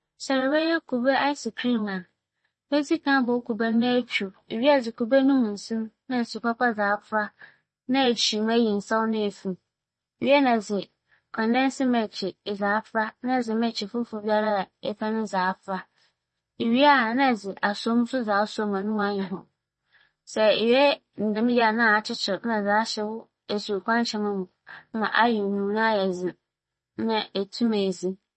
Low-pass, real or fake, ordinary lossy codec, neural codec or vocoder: 10.8 kHz; fake; MP3, 32 kbps; vocoder, 24 kHz, 100 mel bands, Vocos